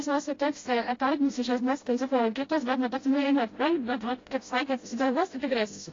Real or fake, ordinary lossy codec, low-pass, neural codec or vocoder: fake; AAC, 32 kbps; 7.2 kHz; codec, 16 kHz, 0.5 kbps, FreqCodec, smaller model